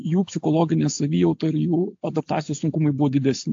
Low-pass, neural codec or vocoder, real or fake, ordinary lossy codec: 7.2 kHz; none; real; AAC, 48 kbps